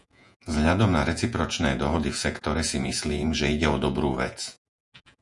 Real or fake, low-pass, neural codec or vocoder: fake; 10.8 kHz; vocoder, 48 kHz, 128 mel bands, Vocos